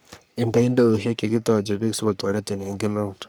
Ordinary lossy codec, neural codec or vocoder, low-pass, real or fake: none; codec, 44.1 kHz, 3.4 kbps, Pupu-Codec; none; fake